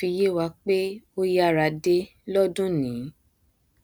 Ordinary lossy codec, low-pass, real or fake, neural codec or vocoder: none; none; real; none